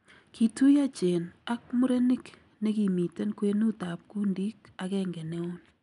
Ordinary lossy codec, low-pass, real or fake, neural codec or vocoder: none; 10.8 kHz; real; none